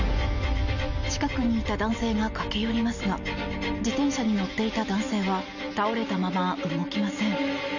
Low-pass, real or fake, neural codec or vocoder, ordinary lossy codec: 7.2 kHz; real; none; none